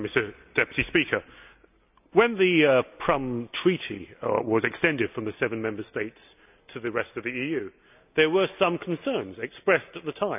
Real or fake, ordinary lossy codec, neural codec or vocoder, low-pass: real; none; none; 3.6 kHz